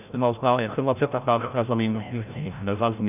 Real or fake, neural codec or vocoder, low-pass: fake; codec, 16 kHz, 0.5 kbps, FreqCodec, larger model; 3.6 kHz